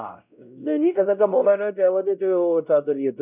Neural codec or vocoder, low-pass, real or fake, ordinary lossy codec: codec, 16 kHz, 0.5 kbps, X-Codec, WavLM features, trained on Multilingual LibriSpeech; 3.6 kHz; fake; none